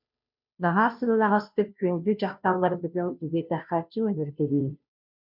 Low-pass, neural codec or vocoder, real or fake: 5.4 kHz; codec, 16 kHz, 0.5 kbps, FunCodec, trained on Chinese and English, 25 frames a second; fake